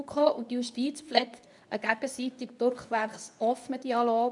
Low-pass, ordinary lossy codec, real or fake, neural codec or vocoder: 10.8 kHz; none; fake; codec, 24 kHz, 0.9 kbps, WavTokenizer, medium speech release version 2